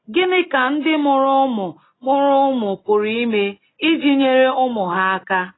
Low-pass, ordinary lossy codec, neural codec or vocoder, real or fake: 7.2 kHz; AAC, 16 kbps; none; real